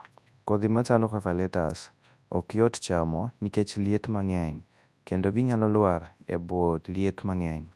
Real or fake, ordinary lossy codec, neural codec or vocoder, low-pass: fake; none; codec, 24 kHz, 0.9 kbps, WavTokenizer, large speech release; none